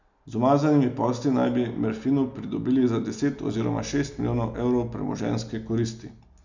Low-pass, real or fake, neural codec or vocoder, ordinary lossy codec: 7.2 kHz; real; none; none